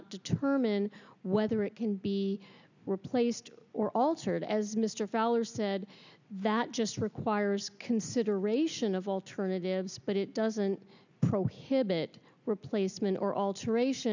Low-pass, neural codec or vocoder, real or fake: 7.2 kHz; none; real